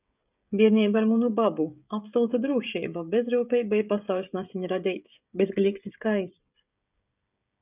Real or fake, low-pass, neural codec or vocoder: fake; 3.6 kHz; codec, 16 kHz, 16 kbps, FreqCodec, smaller model